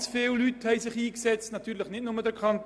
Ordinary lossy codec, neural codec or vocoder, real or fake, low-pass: none; none; real; none